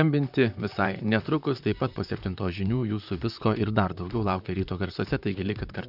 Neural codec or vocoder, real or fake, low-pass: vocoder, 44.1 kHz, 128 mel bands, Pupu-Vocoder; fake; 5.4 kHz